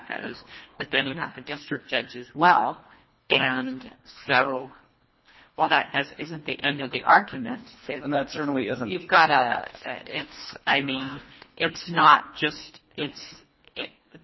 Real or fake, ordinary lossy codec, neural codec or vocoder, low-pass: fake; MP3, 24 kbps; codec, 24 kHz, 1.5 kbps, HILCodec; 7.2 kHz